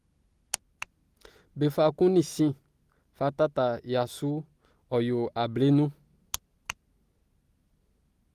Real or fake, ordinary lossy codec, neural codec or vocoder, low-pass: real; Opus, 24 kbps; none; 14.4 kHz